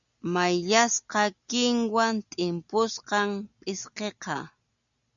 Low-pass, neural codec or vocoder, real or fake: 7.2 kHz; none; real